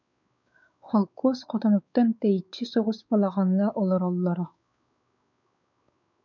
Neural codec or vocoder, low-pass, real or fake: codec, 16 kHz, 4 kbps, X-Codec, WavLM features, trained on Multilingual LibriSpeech; 7.2 kHz; fake